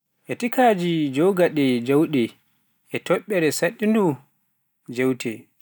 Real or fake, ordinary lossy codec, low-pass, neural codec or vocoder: real; none; none; none